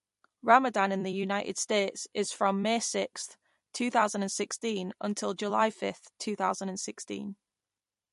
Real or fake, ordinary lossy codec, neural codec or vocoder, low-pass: fake; MP3, 48 kbps; vocoder, 44.1 kHz, 128 mel bands every 256 samples, BigVGAN v2; 14.4 kHz